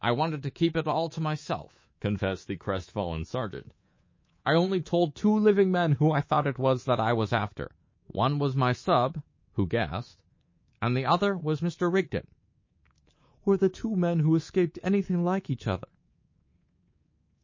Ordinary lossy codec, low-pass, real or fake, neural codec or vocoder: MP3, 32 kbps; 7.2 kHz; fake; codec, 24 kHz, 3.1 kbps, DualCodec